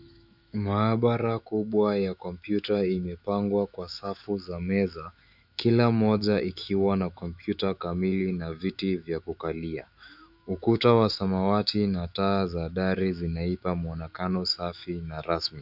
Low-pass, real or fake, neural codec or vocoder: 5.4 kHz; fake; autoencoder, 48 kHz, 128 numbers a frame, DAC-VAE, trained on Japanese speech